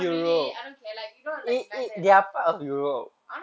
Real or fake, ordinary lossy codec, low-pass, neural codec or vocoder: real; none; none; none